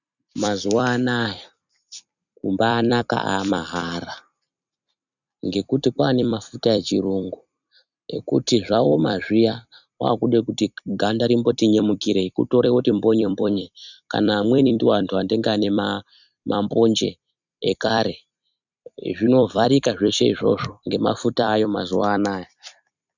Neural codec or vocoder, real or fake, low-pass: vocoder, 44.1 kHz, 128 mel bands every 256 samples, BigVGAN v2; fake; 7.2 kHz